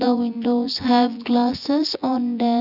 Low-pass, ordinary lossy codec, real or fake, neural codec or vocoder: 5.4 kHz; none; fake; vocoder, 24 kHz, 100 mel bands, Vocos